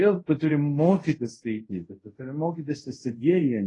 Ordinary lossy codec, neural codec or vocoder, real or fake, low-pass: AAC, 32 kbps; codec, 24 kHz, 0.5 kbps, DualCodec; fake; 10.8 kHz